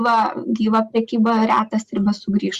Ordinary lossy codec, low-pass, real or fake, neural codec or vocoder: Opus, 64 kbps; 14.4 kHz; real; none